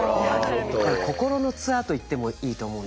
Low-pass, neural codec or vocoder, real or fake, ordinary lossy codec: none; none; real; none